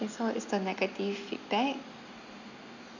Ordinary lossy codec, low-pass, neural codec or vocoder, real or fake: none; 7.2 kHz; none; real